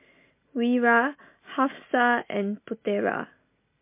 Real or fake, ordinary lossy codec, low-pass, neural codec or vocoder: real; MP3, 24 kbps; 3.6 kHz; none